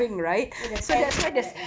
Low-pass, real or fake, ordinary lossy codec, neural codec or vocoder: none; real; none; none